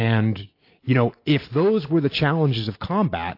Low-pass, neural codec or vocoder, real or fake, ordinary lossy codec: 5.4 kHz; none; real; AAC, 32 kbps